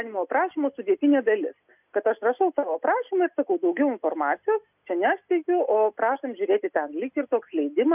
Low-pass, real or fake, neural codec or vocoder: 3.6 kHz; real; none